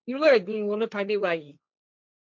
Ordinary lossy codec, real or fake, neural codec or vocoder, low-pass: none; fake; codec, 16 kHz, 1.1 kbps, Voila-Tokenizer; none